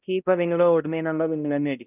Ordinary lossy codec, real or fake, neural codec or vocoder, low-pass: none; fake; codec, 16 kHz, 0.5 kbps, X-Codec, HuBERT features, trained on balanced general audio; 3.6 kHz